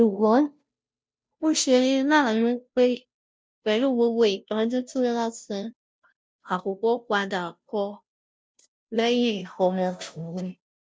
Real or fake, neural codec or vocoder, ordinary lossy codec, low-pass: fake; codec, 16 kHz, 0.5 kbps, FunCodec, trained on Chinese and English, 25 frames a second; none; none